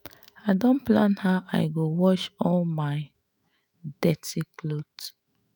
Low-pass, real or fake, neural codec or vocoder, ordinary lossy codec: none; fake; autoencoder, 48 kHz, 128 numbers a frame, DAC-VAE, trained on Japanese speech; none